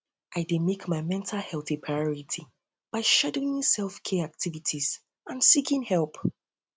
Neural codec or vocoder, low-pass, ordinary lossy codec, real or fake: none; none; none; real